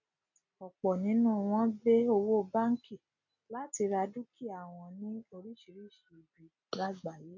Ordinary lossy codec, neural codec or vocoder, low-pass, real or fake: none; none; 7.2 kHz; real